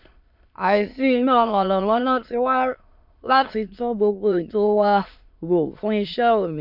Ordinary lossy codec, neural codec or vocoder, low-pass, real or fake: MP3, 48 kbps; autoencoder, 22.05 kHz, a latent of 192 numbers a frame, VITS, trained on many speakers; 5.4 kHz; fake